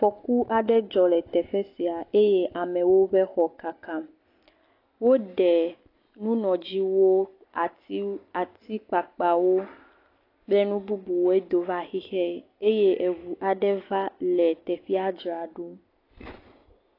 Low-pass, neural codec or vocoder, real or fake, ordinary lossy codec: 5.4 kHz; none; real; AAC, 32 kbps